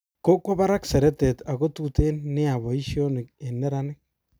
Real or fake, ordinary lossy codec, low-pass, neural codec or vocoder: real; none; none; none